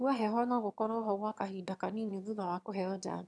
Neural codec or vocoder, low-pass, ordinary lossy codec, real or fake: autoencoder, 22.05 kHz, a latent of 192 numbers a frame, VITS, trained on one speaker; none; none; fake